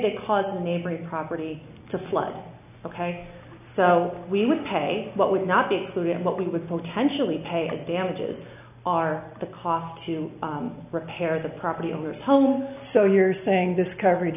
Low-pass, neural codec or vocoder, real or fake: 3.6 kHz; none; real